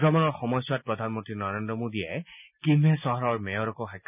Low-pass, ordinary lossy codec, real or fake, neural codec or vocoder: 3.6 kHz; none; real; none